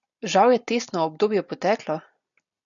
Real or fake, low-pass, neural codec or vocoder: real; 7.2 kHz; none